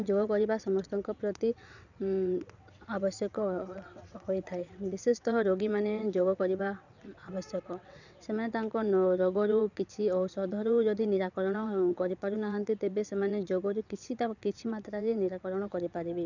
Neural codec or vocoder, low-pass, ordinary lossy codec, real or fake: vocoder, 22.05 kHz, 80 mel bands, WaveNeXt; 7.2 kHz; none; fake